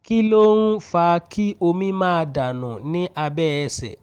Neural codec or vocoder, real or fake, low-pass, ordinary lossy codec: none; real; 19.8 kHz; Opus, 24 kbps